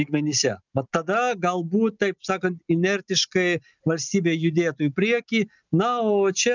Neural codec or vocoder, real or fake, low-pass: none; real; 7.2 kHz